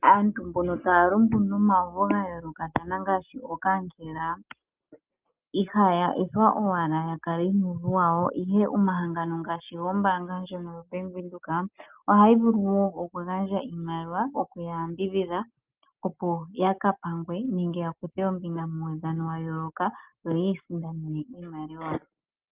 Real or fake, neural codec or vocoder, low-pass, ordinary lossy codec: real; none; 3.6 kHz; Opus, 24 kbps